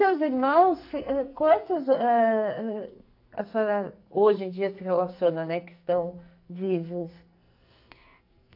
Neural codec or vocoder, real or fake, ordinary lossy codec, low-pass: codec, 44.1 kHz, 2.6 kbps, SNAC; fake; AAC, 48 kbps; 5.4 kHz